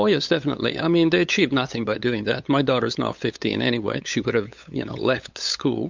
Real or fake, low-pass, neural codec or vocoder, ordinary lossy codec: fake; 7.2 kHz; codec, 16 kHz, 8 kbps, FunCodec, trained on LibriTTS, 25 frames a second; MP3, 64 kbps